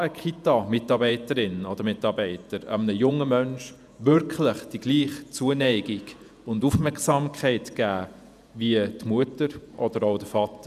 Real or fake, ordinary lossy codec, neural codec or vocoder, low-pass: fake; none; vocoder, 48 kHz, 128 mel bands, Vocos; 14.4 kHz